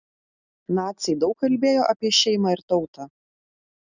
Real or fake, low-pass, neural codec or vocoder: real; 7.2 kHz; none